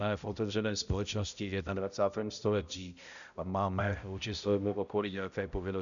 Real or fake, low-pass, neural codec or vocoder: fake; 7.2 kHz; codec, 16 kHz, 0.5 kbps, X-Codec, HuBERT features, trained on balanced general audio